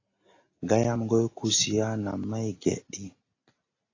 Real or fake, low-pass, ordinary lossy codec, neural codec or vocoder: real; 7.2 kHz; AAC, 32 kbps; none